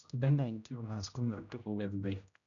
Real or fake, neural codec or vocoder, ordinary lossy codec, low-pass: fake; codec, 16 kHz, 0.5 kbps, X-Codec, HuBERT features, trained on general audio; none; 7.2 kHz